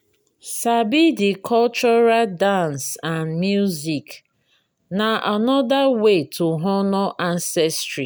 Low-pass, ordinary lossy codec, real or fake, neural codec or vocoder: none; none; real; none